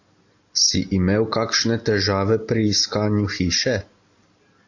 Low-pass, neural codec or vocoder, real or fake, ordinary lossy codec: 7.2 kHz; none; real; AAC, 48 kbps